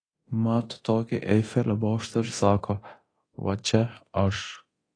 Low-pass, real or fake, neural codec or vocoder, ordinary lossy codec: 9.9 kHz; fake; codec, 24 kHz, 0.9 kbps, DualCodec; AAC, 32 kbps